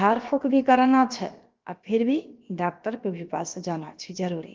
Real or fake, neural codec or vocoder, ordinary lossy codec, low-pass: fake; codec, 16 kHz, about 1 kbps, DyCAST, with the encoder's durations; Opus, 32 kbps; 7.2 kHz